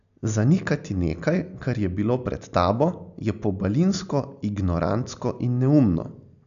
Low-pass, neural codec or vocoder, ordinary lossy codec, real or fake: 7.2 kHz; none; none; real